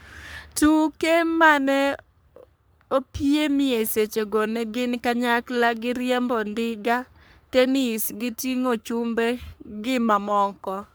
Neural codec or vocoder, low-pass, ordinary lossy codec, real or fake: codec, 44.1 kHz, 3.4 kbps, Pupu-Codec; none; none; fake